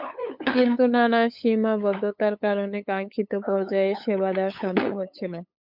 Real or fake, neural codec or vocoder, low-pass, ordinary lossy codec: fake; codec, 16 kHz, 8 kbps, FunCodec, trained on LibriTTS, 25 frames a second; 5.4 kHz; MP3, 48 kbps